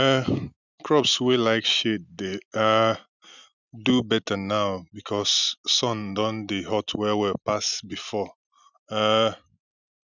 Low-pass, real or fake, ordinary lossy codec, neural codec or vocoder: 7.2 kHz; real; none; none